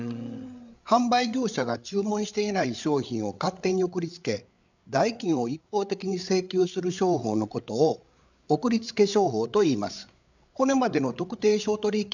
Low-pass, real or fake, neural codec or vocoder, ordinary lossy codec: 7.2 kHz; fake; codec, 16 kHz, 8 kbps, FreqCodec, larger model; none